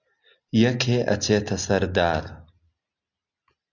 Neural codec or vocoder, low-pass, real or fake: none; 7.2 kHz; real